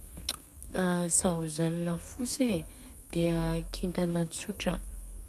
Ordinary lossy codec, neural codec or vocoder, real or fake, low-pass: none; codec, 32 kHz, 1.9 kbps, SNAC; fake; 14.4 kHz